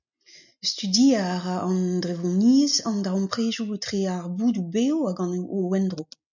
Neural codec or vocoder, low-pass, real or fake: none; 7.2 kHz; real